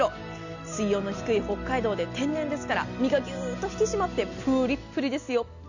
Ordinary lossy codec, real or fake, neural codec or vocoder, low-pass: none; real; none; 7.2 kHz